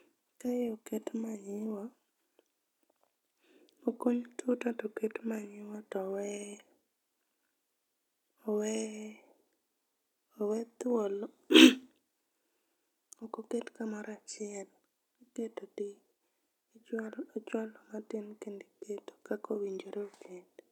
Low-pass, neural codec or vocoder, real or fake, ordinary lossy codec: 19.8 kHz; none; real; none